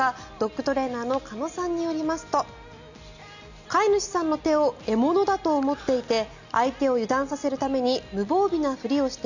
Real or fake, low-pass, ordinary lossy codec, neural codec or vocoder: real; 7.2 kHz; none; none